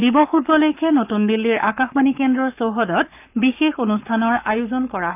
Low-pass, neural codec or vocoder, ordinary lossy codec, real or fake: 3.6 kHz; codec, 44.1 kHz, 7.8 kbps, Pupu-Codec; none; fake